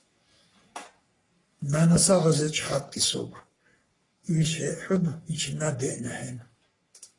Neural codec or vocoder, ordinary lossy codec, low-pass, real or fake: codec, 44.1 kHz, 3.4 kbps, Pupu-Codec; AAC, 32 kbps; 10.8 kHz; fake